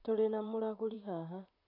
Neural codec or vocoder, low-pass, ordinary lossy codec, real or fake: vocoder, 44.1 kHz, 128 mel bands, Pupu-Vocoder; 5.4 kHz; none; fake